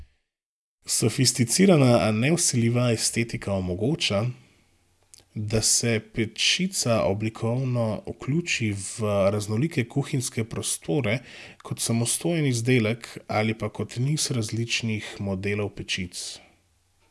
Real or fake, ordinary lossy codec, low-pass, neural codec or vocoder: real; none; none; none